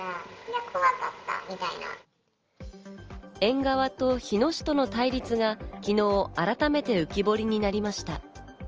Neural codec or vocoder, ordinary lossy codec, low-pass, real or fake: none; Opus, 24 kbps; 7.2 kHz; real